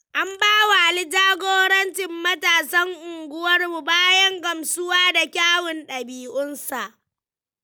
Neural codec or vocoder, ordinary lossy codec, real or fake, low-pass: none; none; real; none